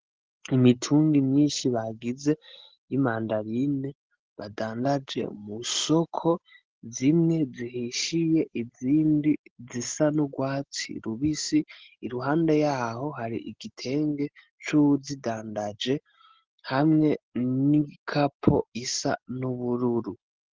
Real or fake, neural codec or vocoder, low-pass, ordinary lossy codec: real; none; 7.2 kHz; Opus, 16 kbps